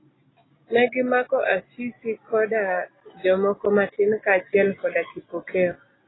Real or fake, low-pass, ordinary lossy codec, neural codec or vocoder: real; 7.2 kHz; AAC, 16 kbps; none